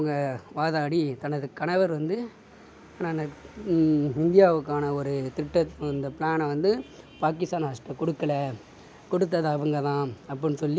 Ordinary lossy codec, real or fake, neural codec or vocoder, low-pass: none; real; none; none